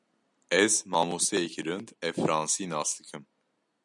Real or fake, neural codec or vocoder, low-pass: real; none; 10.8 kHz